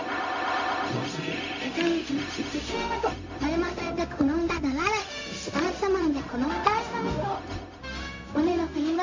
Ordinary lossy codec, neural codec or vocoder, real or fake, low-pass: AAC, 48 kbps; codec, 16 kHz, 0.4 kbps, LongCat-Audio-Codec; fake; 7.2 kHz